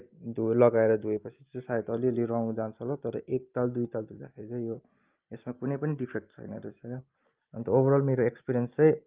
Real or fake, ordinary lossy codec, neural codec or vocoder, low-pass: real; Opus, 32 kbps; none; 3.6 kHz